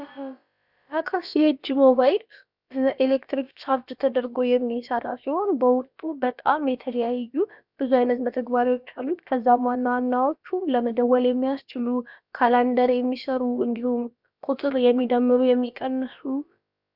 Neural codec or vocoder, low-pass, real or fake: codec, 16 kHz, about 1 kbps, DyCAST, with the encoder's durations; 5.4 kHz; fake